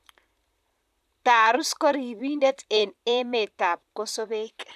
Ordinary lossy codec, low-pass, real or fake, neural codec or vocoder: none; 14.4 kHz; fake; vocoder, 44.1 kHz, 128 mel bands, Pupu-Vocoder